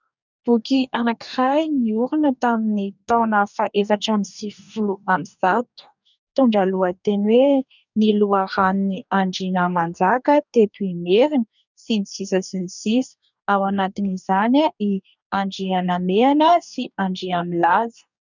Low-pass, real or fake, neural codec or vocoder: 7.2 kHz; fake; codec, 44.1 kHz, 2.6 kbps, DAC